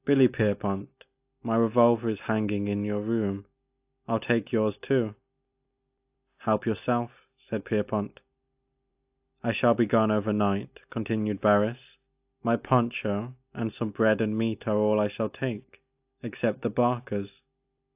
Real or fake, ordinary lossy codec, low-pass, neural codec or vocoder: real; AAC, 32 kbps; 3.6 kHz; none